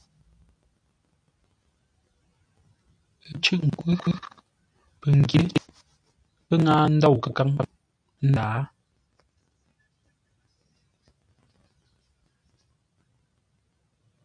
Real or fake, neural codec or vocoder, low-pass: real; none; 9.9 kHz